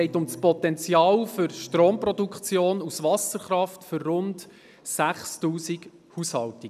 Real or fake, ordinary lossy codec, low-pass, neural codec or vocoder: real; none; 14.4 kHz; none